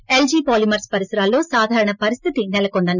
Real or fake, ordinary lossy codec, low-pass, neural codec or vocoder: real; none; 7.2 kHz; none